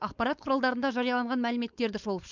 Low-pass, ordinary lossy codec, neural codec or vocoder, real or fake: 7.2 kHz; Opus, 64 kbps; codec, 16 kHz, 4.8 kbps, FACodec; fake